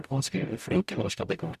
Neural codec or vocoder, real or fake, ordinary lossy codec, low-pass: codec, 44.1 kHz, 0.9 kbps, DAC; fake; none; 14.4 kHz